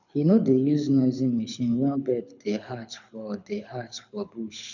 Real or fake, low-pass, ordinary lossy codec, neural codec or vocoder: fake; 7.2 kHz; none; codec, 24 kHz, 6 kbps, HILCodec